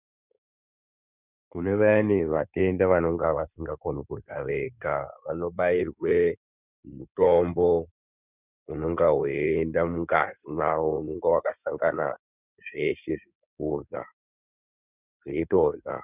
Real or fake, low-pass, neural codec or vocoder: fake; 3.6 kHz; codec, 16 kHz in and 24 kHz out, 2.2 kbps, FireRedTTS-2 codec